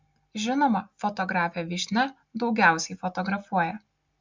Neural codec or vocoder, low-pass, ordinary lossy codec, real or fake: none; 7.2 kHz; MP3, 64 kbps; real